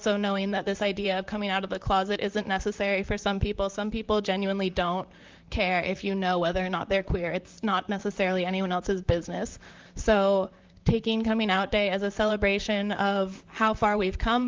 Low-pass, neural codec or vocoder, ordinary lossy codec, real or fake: 7.2 kHz; vocoder, 44.1 kHz, 128 mel bands, Pupu-Vocoder; Opus, 32 kbps; fake